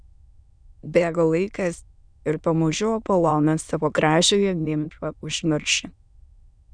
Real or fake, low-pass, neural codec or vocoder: fake; 9.9 kHz; autoencoder, 22.05 kHz, a latent of 192 numbers a frame, VITS, trained on many speakers